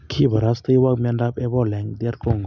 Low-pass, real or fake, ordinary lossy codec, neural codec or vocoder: 7.2 kHz; real; none; none